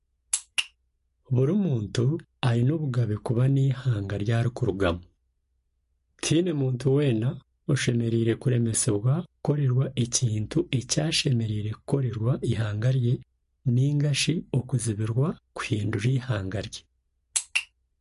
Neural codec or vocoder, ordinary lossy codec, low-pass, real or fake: none; MP3, 48 kbps; 14.4 kHz; real